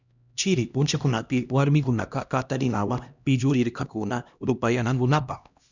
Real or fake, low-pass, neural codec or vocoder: fake; 7.2 kHz; codec, 16 kHz, 1 kbps, X-Codec, HuBERT features, trained on LibriSpeech